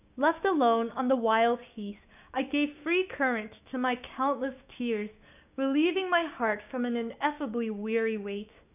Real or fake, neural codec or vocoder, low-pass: fake; codec, 16 kHz, 6 kbps, DAC; 3.6 kHz